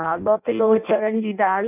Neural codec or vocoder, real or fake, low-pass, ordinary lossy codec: codec, 16 kHz in and 24 kHz out, 0.6 kbps, FireRedTTS-2 codec; fake; 3.6 kHz; none